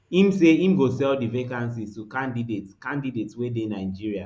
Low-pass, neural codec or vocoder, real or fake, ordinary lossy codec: none; none; real; none